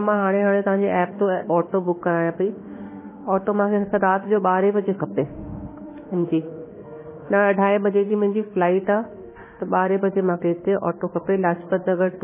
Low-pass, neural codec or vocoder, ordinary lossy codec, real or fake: 3.6 kHz; autoencoder, 48 kHz, 32 numbers a frame, DAC-VAE, trained on Japanese speech; MP3, 16 kbps; fake